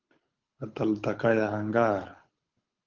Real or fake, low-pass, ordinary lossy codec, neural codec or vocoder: fake; 7.2 kHz; Opus, 32 kbps; codec, 24 kHz, 6 kbps, HILCodec